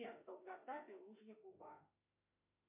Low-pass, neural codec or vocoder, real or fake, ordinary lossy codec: 3.6 kHz; autoencoder, 48 kHz, 32 numbers a frame, DAC-VAE, trained on Japanese speech; fake; MP3, 24 kbps